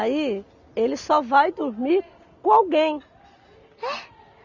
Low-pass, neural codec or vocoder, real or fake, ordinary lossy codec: 7.2 kHz; none; real; none